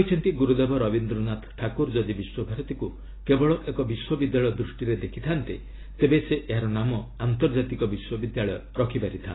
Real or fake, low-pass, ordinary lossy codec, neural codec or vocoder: real; 7.2 kHz; AAC, 16 kbps; none